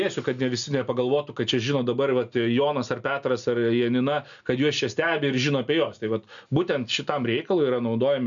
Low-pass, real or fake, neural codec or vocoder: 7.2 kHz; real; none